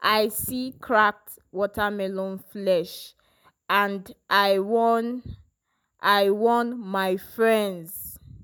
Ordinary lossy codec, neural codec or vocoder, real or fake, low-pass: none; none; real; none